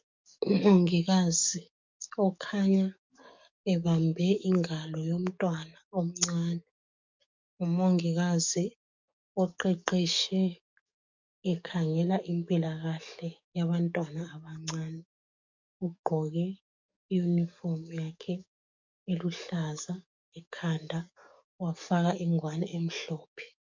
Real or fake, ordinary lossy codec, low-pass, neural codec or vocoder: fake; MP3, 64 kbps; 7.2 kHz; codec, 44.1 kHz, 7.8 kbps, DAC